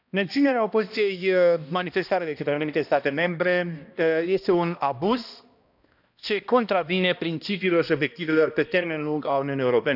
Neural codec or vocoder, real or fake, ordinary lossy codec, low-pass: codec, 16 kHz, 1 kbps, X-Codec, HuBERT features, trained on balanced general audio; fake; none; 5.4 kHz